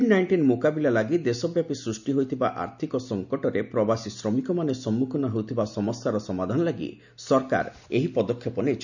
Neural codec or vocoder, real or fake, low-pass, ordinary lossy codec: none; real; 7.2 kHz; none